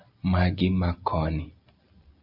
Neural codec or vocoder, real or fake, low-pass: none; real; 5.4 kHz